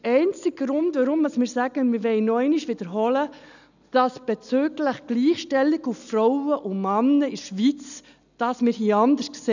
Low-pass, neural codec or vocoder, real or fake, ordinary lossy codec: 7.2 kHz; none; real; none